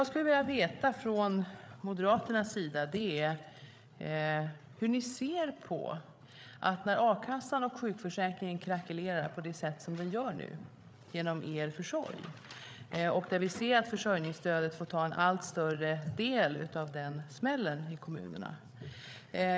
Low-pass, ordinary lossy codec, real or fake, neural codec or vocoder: none; none; fake; codec, 16 kHz, 8 kbps, FreqCodec, larger model